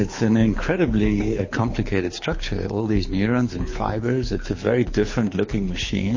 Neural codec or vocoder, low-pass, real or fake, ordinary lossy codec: codec, 24 kHz, 6 kbps, HILCodec; 7.2 kHz; fake; MP3, 32 kbps